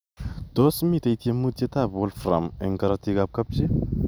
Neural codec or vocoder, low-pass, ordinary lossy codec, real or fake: none; none; none; real